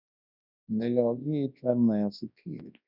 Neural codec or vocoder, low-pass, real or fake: codec, 24 kHz, 0.9 kbps, WavTokenizer, large speech release; 5.4 kHz; fake